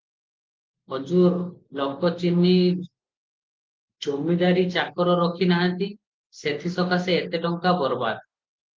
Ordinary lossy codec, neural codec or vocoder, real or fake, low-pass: Opus, 32 kbps; none; real; 7.2 kHz